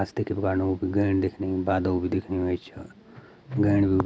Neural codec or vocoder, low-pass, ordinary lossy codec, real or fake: none; none; none; real